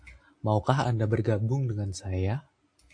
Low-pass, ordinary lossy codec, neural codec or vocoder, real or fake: 9.9 kHz; AAC, 48 kbps; none; real